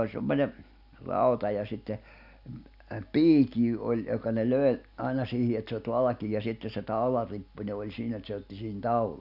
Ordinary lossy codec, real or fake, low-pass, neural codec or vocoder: none; real; 5.4 kHz; none